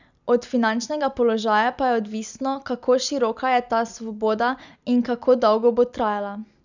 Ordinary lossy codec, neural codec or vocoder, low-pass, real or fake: none; none; 7.2 kHz; real